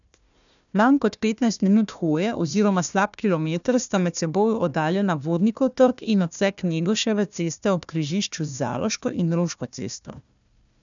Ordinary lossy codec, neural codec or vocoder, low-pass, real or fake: none; codec, 16 kHz, 1 kbps, FunCodec, trained on Chinese and English, 50 frames a second; 7.2 kHz; fake